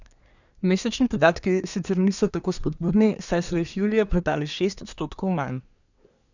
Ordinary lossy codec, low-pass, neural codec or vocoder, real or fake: none; 7.2 kHz; codec, 24 kHz, 1 kbps, SNAC; fake